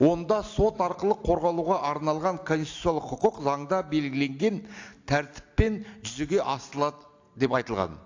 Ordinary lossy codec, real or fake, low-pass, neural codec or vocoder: AAC, 48 kbps; real; 7.2 kHz; none